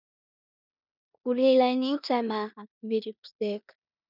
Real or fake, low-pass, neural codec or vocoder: fake; 5.4 kHz; codec, 16 kHz in and 24 kHz out, 0.9 kbps, LongCat-Audio-Codec, four codebook decoder